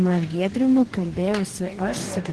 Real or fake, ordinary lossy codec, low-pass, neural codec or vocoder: fake; Opus, 16 kbps; 10.8 kHz; codec, 44.1 kHz, 2.6 kbps, DAC